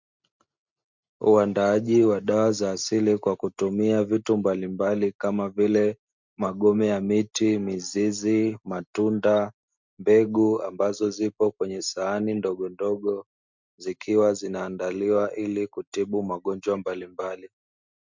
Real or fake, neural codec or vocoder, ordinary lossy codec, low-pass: real; none; MP3, 64 kbps; 7.2 kHz